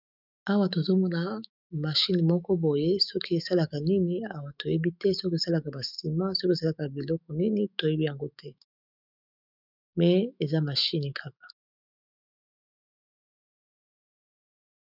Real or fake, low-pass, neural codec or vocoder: fake; 5.4 kHz; autoencoder, 48 kHz, 128 numbers a frame, DAC-VAE, trained on Japanese speech